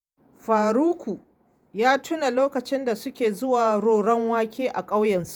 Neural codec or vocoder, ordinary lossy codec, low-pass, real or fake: vocoder, 48 kHz, 128 mel bands, Vocos; none; none; fake